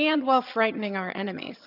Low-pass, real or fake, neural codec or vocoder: 5.4 kHz; fake; vocoder, 22.05 kHz, 80 mel bands, HiFi-GAN